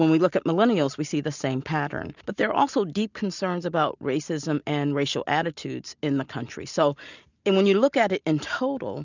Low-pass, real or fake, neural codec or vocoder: 7.2 kHz; real; none